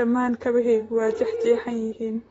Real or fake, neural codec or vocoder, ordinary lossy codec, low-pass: fake; vocoder, 44.1 kHz, 128 mel bands, Pupu-Vocoder; AAC, 24 kbps; 19.8 kHz